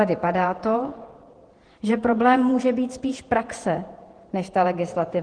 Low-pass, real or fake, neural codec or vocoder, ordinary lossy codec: 9.9 kHz; fake; vocoder, 48 kHz, 128 mel bands, Vocos; Opus, 16 kbps